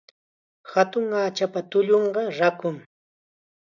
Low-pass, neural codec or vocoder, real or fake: 7.2 kHz; none; real